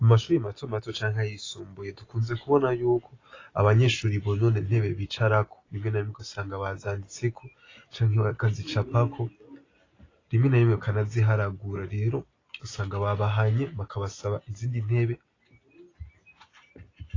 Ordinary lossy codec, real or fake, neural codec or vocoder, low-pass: AAC, 32 kbps; real; none; 7.2 kHz